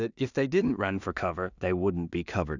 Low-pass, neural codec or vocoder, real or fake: 7.2 kHz; codec, 16 kHz in and 24 kHz out, 0.4 kbps, LongCat-Audio-Codec, two codebook decoder; fake